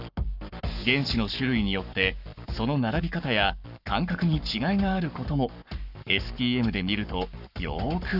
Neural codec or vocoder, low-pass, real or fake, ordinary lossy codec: codec, 44.1 kHz, 7.8 kbps, Pupu-Codec; 5.4 kHz; fake; none